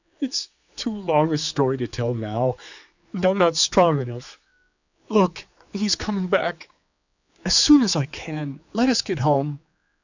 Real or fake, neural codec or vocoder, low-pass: fake; codec, 16 kHz, 4 kbps, X-Codec, HuBERT features, trained on general audio; 7.2 kHz